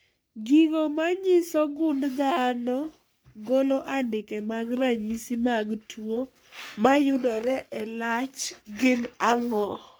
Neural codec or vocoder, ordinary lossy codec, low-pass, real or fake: codec, 44.1 kHz, 3.4 kbps, Pupu-Codec; none; none; fake